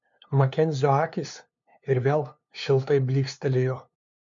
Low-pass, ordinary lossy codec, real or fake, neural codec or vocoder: 7.2 kHz; MP3, 48 kbps; fake; codec, 16 kHz, 2 kbps, FunCodec, trained on LibriTTS, 25 frames a second